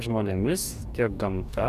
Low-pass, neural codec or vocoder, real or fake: 14.4 kHz; codec, 44.1 kHz, 2.6 kbps, DAC; fake